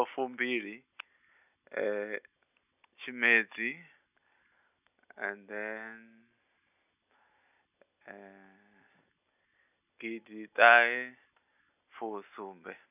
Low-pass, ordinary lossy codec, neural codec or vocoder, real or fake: 3.6 kHz; none; none; real